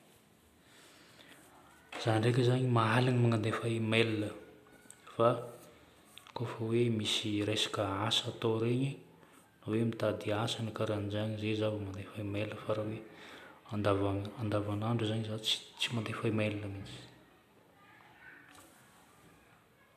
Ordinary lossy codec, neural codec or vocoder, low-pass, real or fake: none; none; 14.4 kHz; real